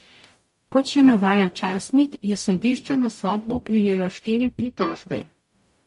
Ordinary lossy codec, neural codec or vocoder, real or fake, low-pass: MP3, 48 kbps; codec, 44.1 kHz, 0.9 kbps, DAC; fake; 14.4 kHz